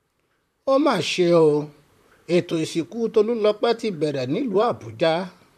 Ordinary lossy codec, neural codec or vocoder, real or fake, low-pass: none; vocoder, 44.1 kHz, 128 mel bands, Pupu-Vocoder; fake; 14.4 kHz